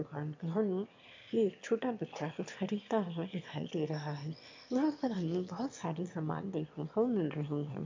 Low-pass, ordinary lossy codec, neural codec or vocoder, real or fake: 7.2 kHz; MP3, 48 kbps; autoencoder, 22.05 kHz, a latent of 192 numbers a frame, VITS, trained on one speaker; fake